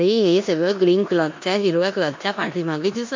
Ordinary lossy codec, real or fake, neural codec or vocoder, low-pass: MP3, 48 kbps; fake; codec, 16 kHz in and 24 kHz out, 0.9 kbps, LongCat-Audio-Codec, four codebook decoder; 7.2 kHz